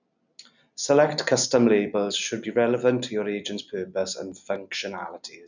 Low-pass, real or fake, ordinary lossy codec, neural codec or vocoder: 7.2 kHz; real; none; none